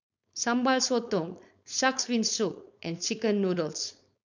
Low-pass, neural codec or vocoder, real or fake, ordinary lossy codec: 7.2 kHz; codec, 16 kHz, 4.8 kbps, FACodec; fake; none